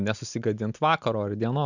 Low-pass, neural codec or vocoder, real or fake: 7.2 kHz; none; real